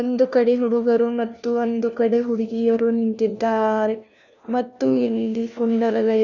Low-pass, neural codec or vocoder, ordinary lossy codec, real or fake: 7.2 kHz; codec, 16 kHz, 1 kbps, FunCodec, trained on LibriTTS, 50 frames a second; none; fake